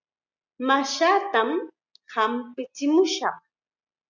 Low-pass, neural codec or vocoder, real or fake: 7.2 kHz; none; real